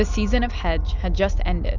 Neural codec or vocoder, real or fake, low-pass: none; real; 7.2 kHz